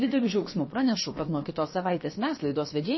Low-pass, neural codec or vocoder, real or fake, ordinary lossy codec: 7.2 kHz; codec, 16 kHz, about 1 kbps, DyCAST, with the encoder's durations; fake; MP3, 24 kbps